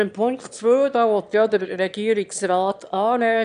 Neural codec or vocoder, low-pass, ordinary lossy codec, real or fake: autoencoder, 22.05 kHz, a latent of 192 numbers a frame, VITS, trained on one speaker; 9.9 kHz; none; fake